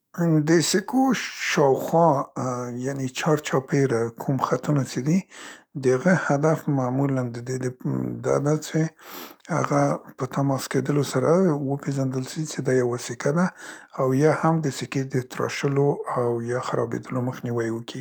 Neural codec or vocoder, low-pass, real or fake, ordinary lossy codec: codec, 44.1 kHz, 7.8 kbps, DAC; none; fake; none